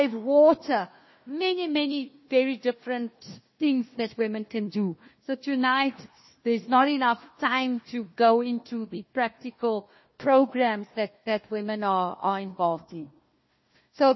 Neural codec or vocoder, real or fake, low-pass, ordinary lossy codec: codec, 16 kHz, 1 kbps, FunCodec, trained on Chinese and English, 50 frames a second; fake; 7.2 kHz; MP3, 24 kbps